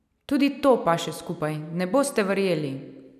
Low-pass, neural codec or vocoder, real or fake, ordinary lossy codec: 14.4 kHz; none; real; none